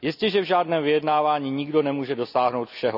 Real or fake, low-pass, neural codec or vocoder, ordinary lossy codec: real; 5.4 kHz; none; none